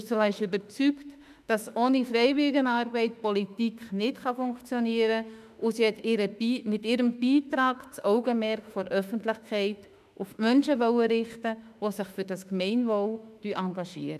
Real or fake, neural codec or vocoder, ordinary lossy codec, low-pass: fake; autoencoder, 48 kHz, 32 numbers a frame, DAC-VAE, trained on Japanese speech; none; 14.4 kHz